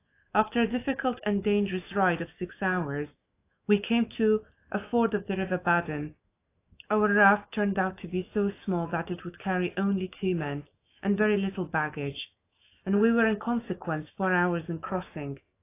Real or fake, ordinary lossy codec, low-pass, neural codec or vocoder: fake; AAC, 24 kbps; 3.6 kHz; autoencoder, 48 kHz, 128 numbers a frame, DAC-VAE, trained on Japanese speech